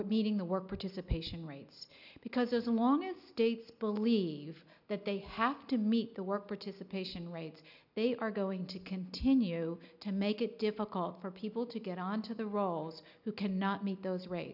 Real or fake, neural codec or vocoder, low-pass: real; none; 5.4 kHz